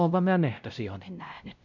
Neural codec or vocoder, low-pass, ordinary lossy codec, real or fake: codec, 16 kHz, 0.5 kbps, X-Codec, WavLM features, trained on Multilingual LibriSpeech; 7.2 kHz; none; fake